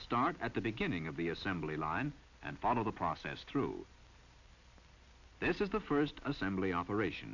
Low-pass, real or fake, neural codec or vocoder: 7.2 kHz; real; none